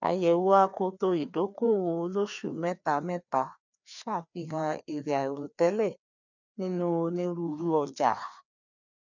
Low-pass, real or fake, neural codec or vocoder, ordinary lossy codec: 7.2 kHz; fake; codec, 16 kHz, 2 kbps, FreqCodec, larger model; none